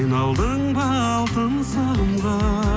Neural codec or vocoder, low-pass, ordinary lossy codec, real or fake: none; none; none; real